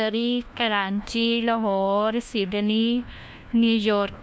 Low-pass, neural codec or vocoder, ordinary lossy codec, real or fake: none; codec, 16 kHz, 1 kbps, FunCodec, trained on LibriTTS, 50 frames a second; none; fake